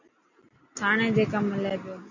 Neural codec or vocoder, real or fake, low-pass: none; real; 7.2 kHz